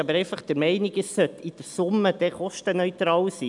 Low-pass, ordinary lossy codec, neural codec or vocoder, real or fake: 10.8 kHz; none; none; real